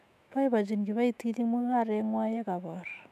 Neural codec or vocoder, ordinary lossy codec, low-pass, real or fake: autoencoder, 48 kHz, 128 numbers a frame, DAC-VAE, trained on Japanese speech; none; 14.4 kHz; fake